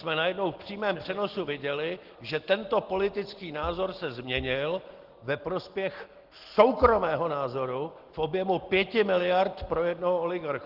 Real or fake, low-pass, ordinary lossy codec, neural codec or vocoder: real; 5.4 kHz; Opus, 16 kbps; none